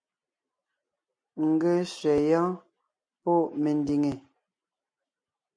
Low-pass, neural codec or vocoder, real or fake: 9.9 kHz; none; real